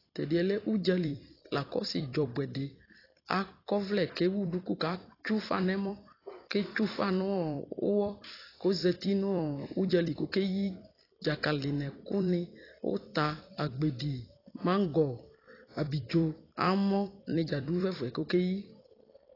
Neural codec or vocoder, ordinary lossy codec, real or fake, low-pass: vocoder, 44.1 kHz, 128 mel bands every 256 samples, BigVGAN v2; AAC, 24 kbps; fake; 5.4 kHz